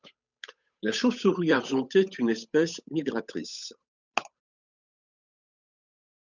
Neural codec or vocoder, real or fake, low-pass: codec, 16 kHz, 8 kbps, FunCodec, trained on Chinese and English, 25 frames a second; fake; 7.2 kHz